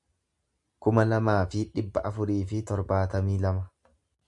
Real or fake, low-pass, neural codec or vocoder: real; 10.8 kHz; none